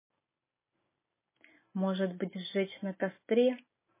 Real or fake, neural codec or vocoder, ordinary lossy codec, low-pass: real; none; MP3, 16 kbps; 3.6 kHz